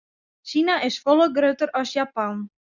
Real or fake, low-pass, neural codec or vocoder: real; 7.2 kHz; none